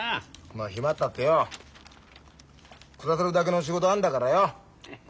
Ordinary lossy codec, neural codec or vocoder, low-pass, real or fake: none; none; none; real